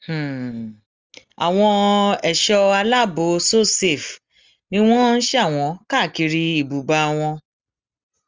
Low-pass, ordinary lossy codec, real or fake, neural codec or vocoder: 7.2 kHz; Opus, 32 kbps; real; none